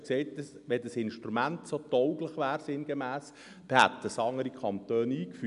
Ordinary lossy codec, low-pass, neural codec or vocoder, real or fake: none; 10.8 kHz; none; real